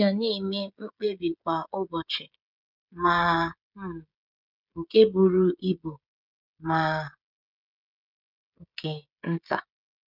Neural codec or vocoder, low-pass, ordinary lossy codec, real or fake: codec, 16 kHz, 16 kbps, FreqCodec, smaller model; 5.4 kHz; none; fake